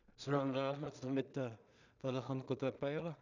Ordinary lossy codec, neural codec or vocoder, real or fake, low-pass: none; codec, 16 kHz in and 24 kHz out, 0.4 kbps, LongCat-Audio-Codec, two codebook decoder; fake; 7.2 kHz